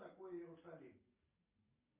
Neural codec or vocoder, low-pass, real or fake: none; 3.6 kHz; real